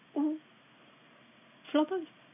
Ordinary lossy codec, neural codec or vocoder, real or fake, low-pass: none; none; real; 3.6 kHz